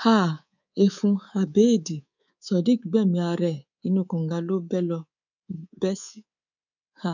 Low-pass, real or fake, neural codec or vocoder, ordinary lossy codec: 7.2 kHz; fake; codec, 24 kHz, 3.1 kbps, DualCodec; none